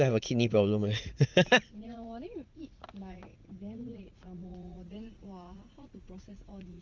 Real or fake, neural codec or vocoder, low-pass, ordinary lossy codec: fake; vocoder, 44.1 kHz, 80 mel bands, Vocos; 7.2 kHz; Opus, 32 kbps